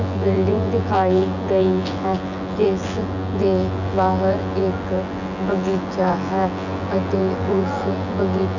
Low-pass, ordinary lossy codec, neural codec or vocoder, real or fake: 7.2 kHz; none; vocoder, 24 kHz, 100 mel bands, Vocos; fake